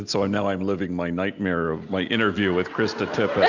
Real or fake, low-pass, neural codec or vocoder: real; 7.2 kHz; none